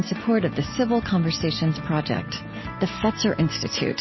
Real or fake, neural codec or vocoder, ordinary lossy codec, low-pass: real; none; MP3, 24 kbps; 7.2 kHz